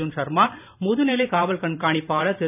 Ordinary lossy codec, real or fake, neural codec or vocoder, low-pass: none; fake; vocoder, 44.1 kHz, 128 mel bands every 512 samples, BigVGAN v2; 3.6 kHz